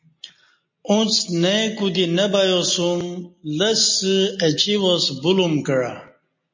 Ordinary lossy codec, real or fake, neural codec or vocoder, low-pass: MP3, 32 kbps; real; none; 7.2 kHz